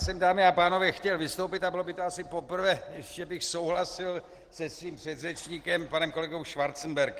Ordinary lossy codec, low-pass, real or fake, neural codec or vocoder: Opus, 16 kbps; 14.4 kHz; real; none